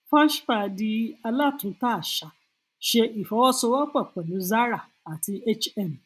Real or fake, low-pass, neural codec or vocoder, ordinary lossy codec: real; 14.4 kHz; none; none